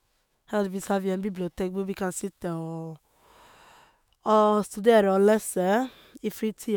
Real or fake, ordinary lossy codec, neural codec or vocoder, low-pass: fake; none; autoencoder, 48 kHz, 128 numbers a frame, DAC-VAE, trained on Japanese speech; none